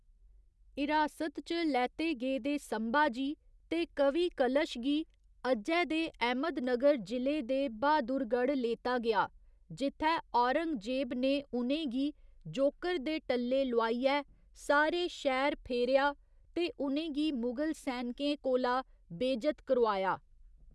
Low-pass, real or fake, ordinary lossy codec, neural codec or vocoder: none; real; none; none